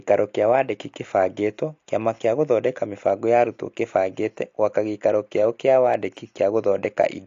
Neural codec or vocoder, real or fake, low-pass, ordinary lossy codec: none; real; 7.2 kHz; AAC, 48 kbps